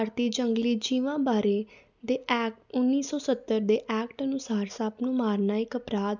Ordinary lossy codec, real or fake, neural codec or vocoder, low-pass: none; real; none; 7.2 kHz